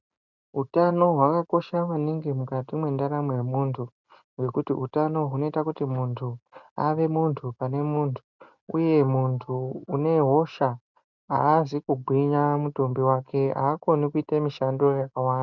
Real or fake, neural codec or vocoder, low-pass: real; none; 7.2 kHz